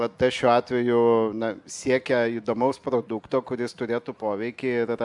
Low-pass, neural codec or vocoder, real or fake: 10.8 kHz; none; real